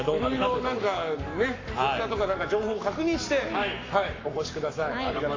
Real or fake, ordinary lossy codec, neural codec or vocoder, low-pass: fake; AAC, 32 kbps; codec, 44.1 kHz, 7.8 kbps, Pupu-Codec; 7.2 kHz